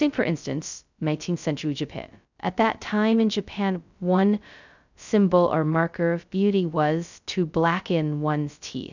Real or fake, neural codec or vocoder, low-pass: fake; codec, 16 kHz, 0.2 kbps, FocalCodec; 7.2 kHz